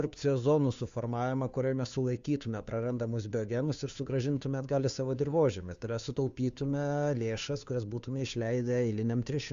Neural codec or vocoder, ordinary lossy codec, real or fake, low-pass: codec, 16 kHz, 2 kbps, FunCodec, trained on Chinese and English, 25 frames a second; Opus, 64 kbps; fake; 7.2 kHz